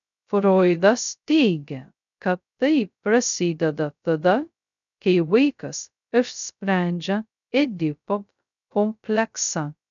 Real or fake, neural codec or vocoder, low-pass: fake; codec, 16 kHz, 0.2 kbps, FocalCodec; 7.2 kHz